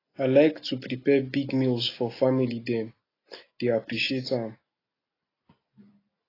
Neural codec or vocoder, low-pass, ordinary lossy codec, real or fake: none; 5.4 kHz; AAC, 24 kbps; real